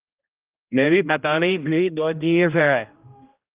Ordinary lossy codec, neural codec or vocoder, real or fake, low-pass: Opus, 32 kbps; codec, 16 kHz, 0.5 kbps, X-Codec, HuBERT features, trained on general audio; fake; 3.6 kHz